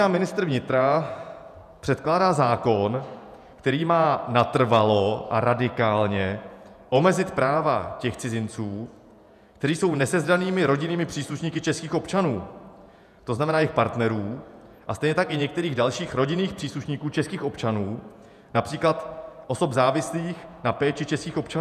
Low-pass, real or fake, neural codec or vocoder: 14.4 kHz; fake; vocoder, 48 kHz, 128 mel bands, Vocos